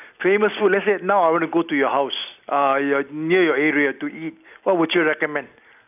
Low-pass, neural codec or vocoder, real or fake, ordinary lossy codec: 3.6 kHz; none; real; none